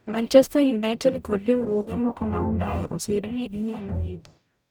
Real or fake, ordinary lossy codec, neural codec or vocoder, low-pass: fake; none; codec, 44.1 kHz, 0.9 kbps, DAC; none